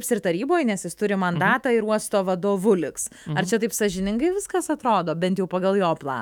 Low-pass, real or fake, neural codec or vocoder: 19.8 kHz; fake; autoencoder, 48 kHz, 128 numbers a frame, DAC-VAE, trained on Japanese speech